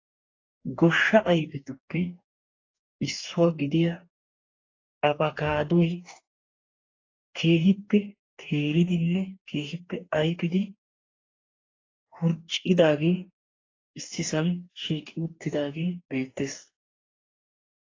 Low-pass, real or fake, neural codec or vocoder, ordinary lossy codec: 7.2 kHz; fake; codec, 44.1 kHz, 2.6 kbps, DAC; AAC, 32 kbps